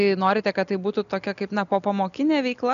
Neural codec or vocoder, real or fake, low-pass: none; real; 7.2 kHz